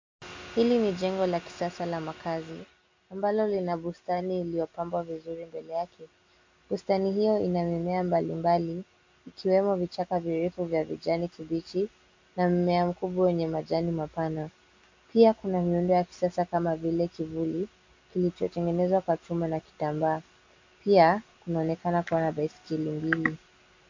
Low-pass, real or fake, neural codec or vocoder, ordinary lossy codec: 7.2 kHz; real; none; MP3, 64 kbps